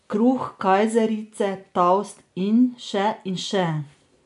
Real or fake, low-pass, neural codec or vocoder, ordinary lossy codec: real; 10.8 kHz; none; none